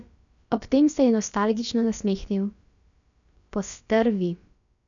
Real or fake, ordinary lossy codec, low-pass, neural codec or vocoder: fake; none; 7.2 kHz; codec, 16 kHz, about 1 kbps, DyCAST, with the encoder's durations